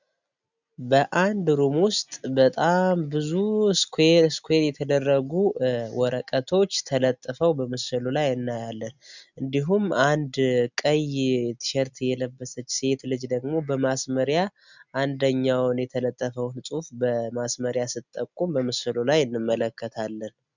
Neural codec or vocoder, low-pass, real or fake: none; 7.2 kHz; real